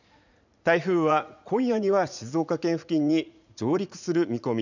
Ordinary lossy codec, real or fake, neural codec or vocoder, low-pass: none; fake; vocoder, 44.1 kHz, 80 mel bands, Vocos; 7.2 kHz